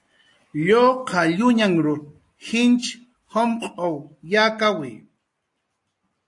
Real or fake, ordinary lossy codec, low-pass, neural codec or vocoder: real; AAC, 64 kbps; 10.8 kHz; none